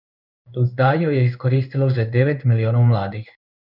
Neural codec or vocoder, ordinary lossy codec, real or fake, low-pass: codec, 16 kHz in and 24 kHz out, 1 kbps, XY-Tokenizer; none; fake; 5.4 kHz